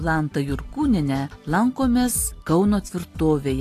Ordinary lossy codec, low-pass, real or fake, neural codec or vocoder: AAC, 48 kbps; 14.4 kHz; real; none